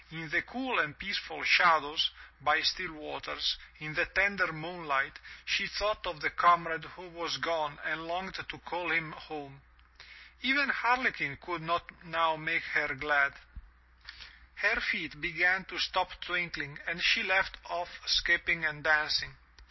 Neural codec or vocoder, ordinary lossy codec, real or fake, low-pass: none; MP3, 24 kbps; real; 7.2 kHz